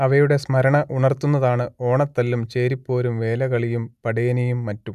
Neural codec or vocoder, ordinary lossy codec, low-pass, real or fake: none; none; 14.4 kHz; real